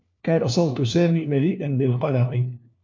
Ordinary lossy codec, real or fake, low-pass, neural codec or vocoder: MP3, 64 kbps; fake; 7.2 kHz; codec, 16 kHz, 1 kbps, FunCodec, trained on LibriTTS, 50 frames a second